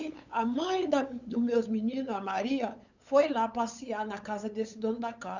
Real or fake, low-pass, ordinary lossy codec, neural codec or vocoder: fake; 7.2 kHz; none; codec, 16 kHz, 8 kbps, FunCodec, trained on LibriTTS, 25 frames a second